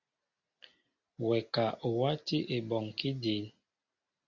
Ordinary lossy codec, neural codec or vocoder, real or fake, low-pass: Opus, 64 kbps; none; real; 7.2 kHz